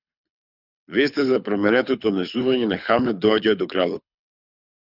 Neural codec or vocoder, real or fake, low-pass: codec, 24 kHz, 6 kbps, HILCodec; fake; 5.4 kHz